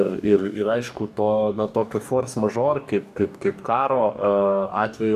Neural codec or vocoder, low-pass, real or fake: codec, 44.1 kHz, 2.6 kbps, DAC; 14.4 kHz; fake